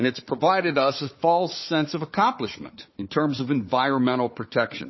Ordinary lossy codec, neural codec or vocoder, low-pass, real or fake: MP3, 24 kbps; codec, 16 kHz, 4 kbps, FunCodec, trained on Chinese and English, 50 frames a second; 7.2 kHz; fake